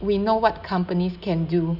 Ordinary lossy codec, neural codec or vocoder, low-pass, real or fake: none; none; 5.4 kHz; real